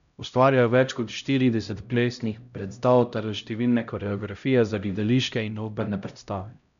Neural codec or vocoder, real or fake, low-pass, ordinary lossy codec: codec, 16 kHz, 0.5 kbps, X-Codec, HuBERT features, trained on LibriSpeech; fake; 7.2 kHz; none